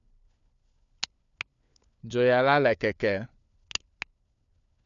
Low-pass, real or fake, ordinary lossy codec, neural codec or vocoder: 7.2 kHz; fake; none; codec, 16 kHz, 4 kbps, FunCodec, trained on LibriTTS, 50 frames a second